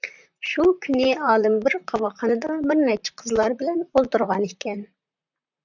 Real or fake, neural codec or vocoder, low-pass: fake; vocoder, 44.1 kHz, 128 mel bands, Pupu-Vocoder; 7.2 kHz